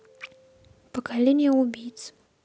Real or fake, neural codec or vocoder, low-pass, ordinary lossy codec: real; none; none; none